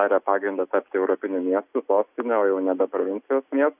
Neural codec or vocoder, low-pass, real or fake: none; 3.6 kHz; real